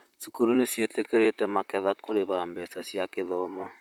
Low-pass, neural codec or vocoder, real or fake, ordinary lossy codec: 19.8 kHz; vocoder, 44.1 kHz, 128 mel bands, Pupu-Vocoder; fake; none